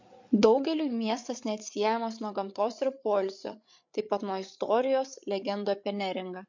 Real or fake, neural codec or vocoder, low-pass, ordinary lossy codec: fake; codec, 16 kHz, 8 kbps, FreqCodec, larger model; 7.2 kHz; MP3, 48 kbps